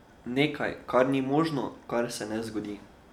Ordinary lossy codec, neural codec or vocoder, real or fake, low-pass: none; none; real; 19.8 kHz